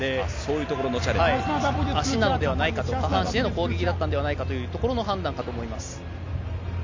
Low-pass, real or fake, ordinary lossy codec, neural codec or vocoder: 7.2 kHz; real; MP3, 48 kbps; none